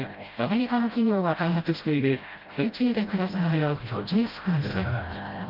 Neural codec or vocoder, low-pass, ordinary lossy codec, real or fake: codec, 16 kHz, 0.5 kbps, FreqCodec, smaller model; 5.4 kHz; Opus, 32 kbps; fake